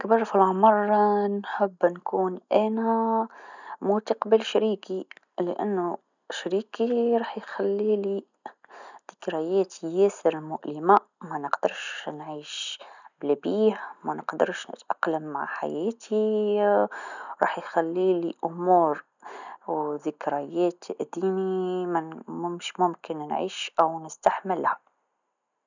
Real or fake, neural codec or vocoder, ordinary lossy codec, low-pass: real; none; none; 7.2 kHz